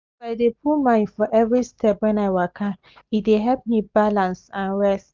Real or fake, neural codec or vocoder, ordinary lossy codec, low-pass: real; none; Opus, 32 kbps; 7.2 kHz